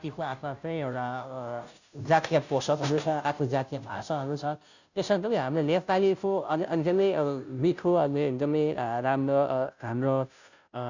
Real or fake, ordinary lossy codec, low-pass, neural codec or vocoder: fake; none; 7.2 kHz; codec, 16 kHz, 0.5 kbps, FunCodec, trained on Chinese and English, 25 frames a second